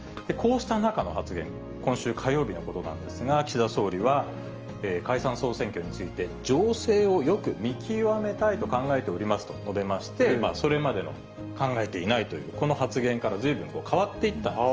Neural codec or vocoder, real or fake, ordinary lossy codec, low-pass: none; real; Opus, 24 kbps; 7.2 kHz